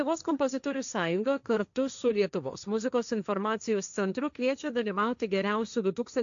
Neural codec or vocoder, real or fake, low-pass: codec, 16 kHz, 1.1 kbps, Voila-Tokenizer; fake; 7.2 kHz